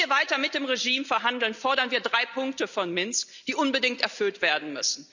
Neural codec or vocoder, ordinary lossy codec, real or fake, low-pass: none; none; real; 7.2 kHz